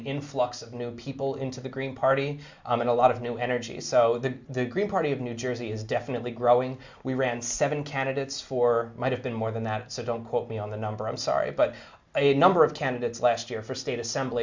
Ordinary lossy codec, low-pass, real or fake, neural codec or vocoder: MP3, 64 kbps; 7.2 kHz; real; none